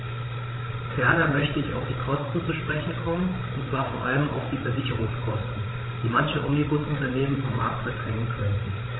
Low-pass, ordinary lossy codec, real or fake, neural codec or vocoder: 7.2 kHz; AAC, 16 kbps; fake; codec, 16 kHz, 16 kbps, FreqCodec, larger model